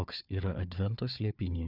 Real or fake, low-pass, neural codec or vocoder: fake; 5.4 kHz; codec, 16 kHz, 4 kbps, FunCodec, trained on Chinese and English, 50 frames a second